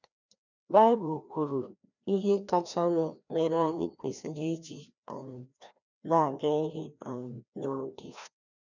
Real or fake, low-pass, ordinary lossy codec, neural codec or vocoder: fake; 7.2 kHz; none; codec, 16 kHz, 1 kbps, FreqCodec, larger model